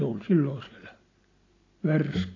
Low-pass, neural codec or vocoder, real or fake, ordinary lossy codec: 7.2 kHz; none; real; AAC, 32 kbps